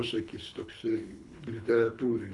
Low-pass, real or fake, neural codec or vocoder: 10.8 kHz; fake; codec, 24 kHz, 3 kbps, HILCodec